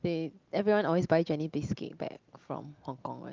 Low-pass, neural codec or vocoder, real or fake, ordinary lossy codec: 7.2 kHz; none; real; Opus, 24 kbps